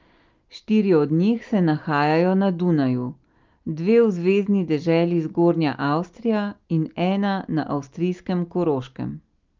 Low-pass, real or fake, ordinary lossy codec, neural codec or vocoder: 7.2 kHz; real; Opus, 24 kbps; none